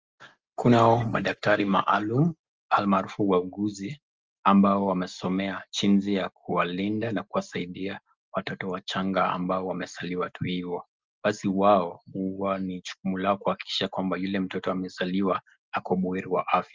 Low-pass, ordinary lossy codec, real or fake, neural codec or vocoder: 7.2 kHz; Opus, 24 kbps; real; none